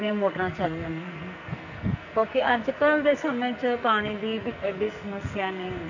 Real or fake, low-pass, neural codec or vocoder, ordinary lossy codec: fake; 7.2 kHz; codec, 44.1 kHz, 2.6 kbps, SNAC; none